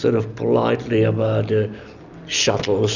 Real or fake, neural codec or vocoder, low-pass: real; none; 7.2 kHz